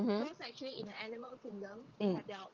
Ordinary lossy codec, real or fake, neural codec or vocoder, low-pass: Opus, 32 kbps; fake; vocoder, 22.05 kHz, 80 mel bands, WaveNeXt; 7.2 kHz